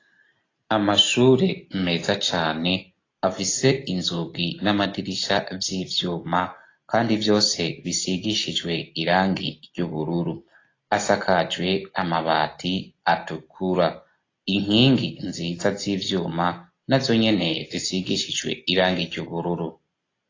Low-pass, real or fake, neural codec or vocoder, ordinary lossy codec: 7.2 kHz; real; none; AAC, 32 kbps